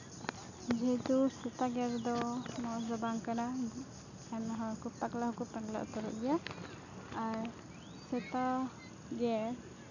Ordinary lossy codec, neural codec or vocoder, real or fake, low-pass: none; none; real; 7.2 kHz